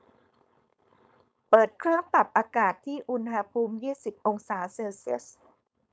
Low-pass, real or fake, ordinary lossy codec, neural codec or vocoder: none; fake; none; codec, 16 kHz, 4.8 kbps, FACodec